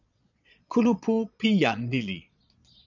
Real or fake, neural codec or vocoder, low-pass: fake; vocoder, 22.05 kHz, 80 mel bands, Vocos; 7.2 kHz